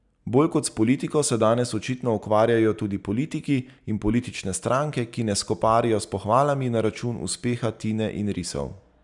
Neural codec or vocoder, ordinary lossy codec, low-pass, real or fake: none; none; 10.8 kHz; real